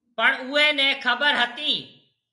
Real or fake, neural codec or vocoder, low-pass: real; none; 10.8 kHz